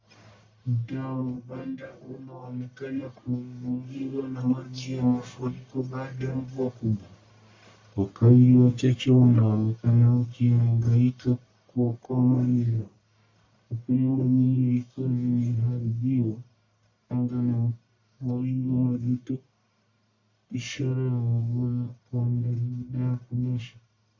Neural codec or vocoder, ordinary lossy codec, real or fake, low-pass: codec, 44.1 kHz, 1.7 kbps, Pupu-Codec; MP3, 48 kbps; fake; 7.2 kHz